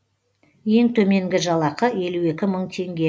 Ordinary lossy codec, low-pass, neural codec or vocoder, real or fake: none; none; none; real